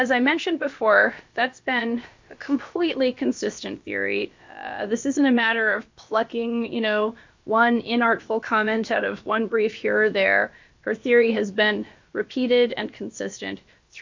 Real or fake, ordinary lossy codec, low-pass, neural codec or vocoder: fake; MP3, 48 kbps; 7.2 kHz; codec, 16 kHz, about 1 kbps, DyCAST, with the encoder's durations